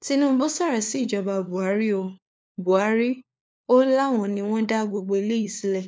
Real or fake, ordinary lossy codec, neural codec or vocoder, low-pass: fake; none; codec, 16 kHz, 4 kbps, FunCodec, trained on LibriTTS, 50 frames a second; none